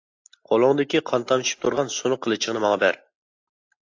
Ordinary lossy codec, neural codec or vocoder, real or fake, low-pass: AAC, 48 kbps; none; real; 7.2 kHz